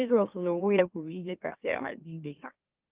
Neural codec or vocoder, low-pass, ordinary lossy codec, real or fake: autoencoder, 44.1 kHz, a latent of 192 numbers a frame, MeloTTS; 3.6 kHz; Opus, 32 kbps; fake